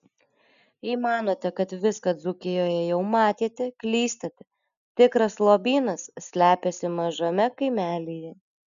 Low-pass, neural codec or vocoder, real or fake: 7.2 kHz; none; real